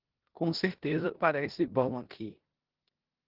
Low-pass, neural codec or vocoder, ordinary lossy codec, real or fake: 5.4 kHz; codec, 16 kHz in and 24 kHz out, 0.9 kbps, LongCat-Audio-Codec, four codebook decoder; Opus, 16 kbps; fake